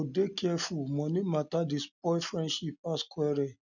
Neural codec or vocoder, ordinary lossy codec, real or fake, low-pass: none; none; real; 7.2 kHz